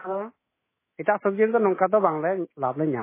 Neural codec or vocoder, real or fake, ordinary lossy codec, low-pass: autoencoder, 48 kHz, 128 numbers a frame, DAC-VAE, trained on Japanese speech; fake; MP3, 16 kbps; 3.6 kHz